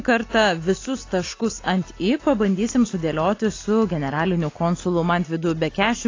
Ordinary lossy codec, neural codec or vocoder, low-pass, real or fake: AAC, 32 kbps; none; 7.2 kHz; real